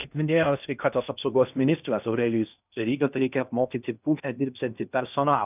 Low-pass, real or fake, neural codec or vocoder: 3.6 kHz; fake; codec, 16 kHz in and 24 kHz out, 0.6 kbps, FocalCodec, streaming, 2048 codes